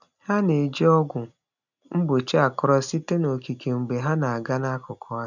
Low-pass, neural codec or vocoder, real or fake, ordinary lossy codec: 7.2 kHz; none; real; none